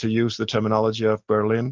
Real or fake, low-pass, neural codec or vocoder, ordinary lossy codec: real; 7.2 kHz; none; Opus, 24 kbps